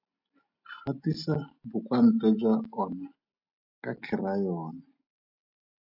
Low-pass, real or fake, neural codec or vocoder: 5.4 kHz; real; none